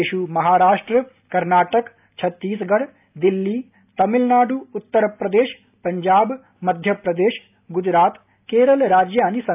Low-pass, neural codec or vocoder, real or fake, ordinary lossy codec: 3.6 kHz; none; real; AAC, 32 kbps